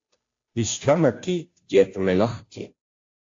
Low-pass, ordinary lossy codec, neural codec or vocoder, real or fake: 7.2 kHz; AAC, 48 kbps; codec, 16 kHz, 0.5 kbps, FunCodec, trained on Chinese and English, 25 frames a second; fake